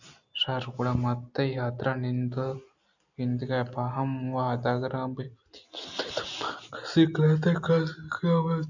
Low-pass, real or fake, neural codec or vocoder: 7.2 kHz; real; none